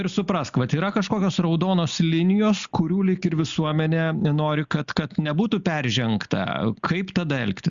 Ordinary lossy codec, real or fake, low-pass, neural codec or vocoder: Opus, 64 kbps; real; 7.2 kHz; none